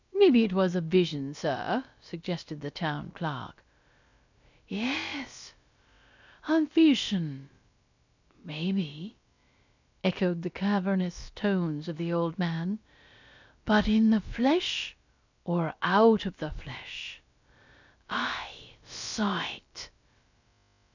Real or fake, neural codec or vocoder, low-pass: fake; codec, 16 kHz, about 1 kbps, DyCAST, with the encoder's durations; 7.2 kHz